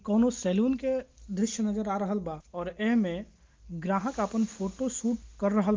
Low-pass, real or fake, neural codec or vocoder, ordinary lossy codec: 7.2 kHz; real; none; Opus, 32 kbps